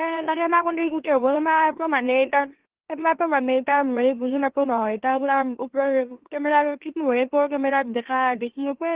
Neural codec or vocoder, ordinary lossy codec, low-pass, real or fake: autoencoder, 44.1 kHz, a latent of 192 numbers a frame, MeloTTS; Opus, 16 kbps; 3.6 kHz; fake